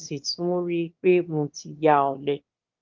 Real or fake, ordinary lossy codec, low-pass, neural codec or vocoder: fake; Opus, 24 kbps; 7.2 kHz; autoencoder, 22.05 kHz, a latent of 192 numbers a frame, VITS, trained on one speaker